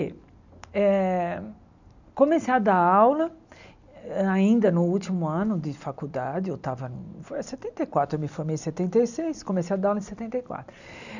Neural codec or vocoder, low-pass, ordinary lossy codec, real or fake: none; 7.2 kHz; none; real